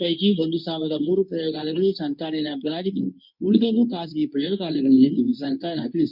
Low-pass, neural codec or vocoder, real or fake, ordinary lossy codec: 5.4 kHz; codec, 24 kHz, 0.9 kbps, WavTokenizer, medium speech release version 2; fake; none